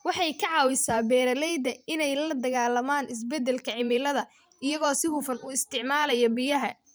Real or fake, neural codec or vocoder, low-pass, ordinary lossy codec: fake; vocoder, 44.1 kHz, 128 mel bands every 512 samples, BigVGAN v2; none; none